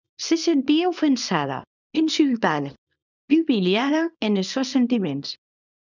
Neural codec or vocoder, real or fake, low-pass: codec, 24 kHz, 0.9 kbps, WavTokenizer, small release; fake; 7.2 kHz